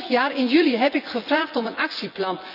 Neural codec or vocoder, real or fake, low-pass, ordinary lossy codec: vocoder, 24 kHz, 100 mel bands, Vocos; fake; 5.4 kHz; none